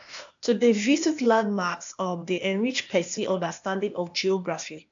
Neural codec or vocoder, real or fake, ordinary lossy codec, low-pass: codec, 16 kHz, 0.8 kbps, ZipCodec; fake; AAC, 64 kbps; 7.2 kHz